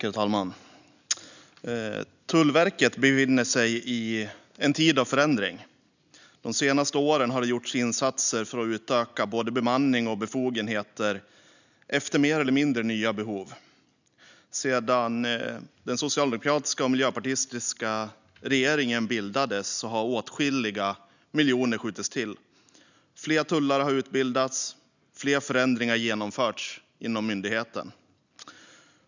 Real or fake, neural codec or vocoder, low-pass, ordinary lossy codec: real; none; 7.2 kHz; none